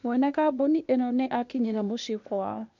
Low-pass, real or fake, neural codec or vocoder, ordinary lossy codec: 7.2 kHz; fake; codec, 16 kHz, 0.8 kbps, ZipCodec; MP3, 64 kbps